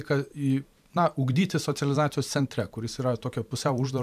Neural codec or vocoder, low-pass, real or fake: vocoder, 44.1 kHz, 128 mel bands every 512 samples, BigVGAN v2; 14.4 kHz; fake